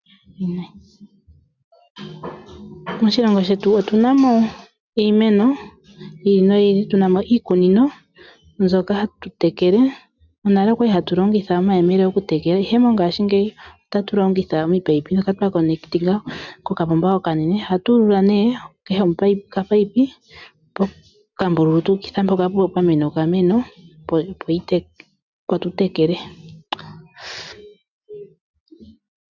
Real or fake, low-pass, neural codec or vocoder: real; 7.2 kHz; none